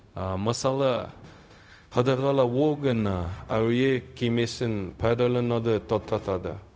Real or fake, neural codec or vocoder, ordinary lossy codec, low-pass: fake; codec, 16 kHz, 0.4 kbps, LongCat-Audio-Codec; none; none